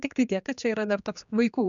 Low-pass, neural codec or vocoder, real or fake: 7.2 kHz; codec, 16 kHz, 2 kbps, X-Codec, HuBERT features, trained on general audio; fake